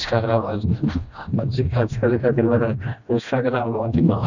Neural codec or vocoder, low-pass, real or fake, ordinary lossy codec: codec, 16 kHz, 1 kbps, FreqCodec, smaller model; 7.2 kHz; fake; none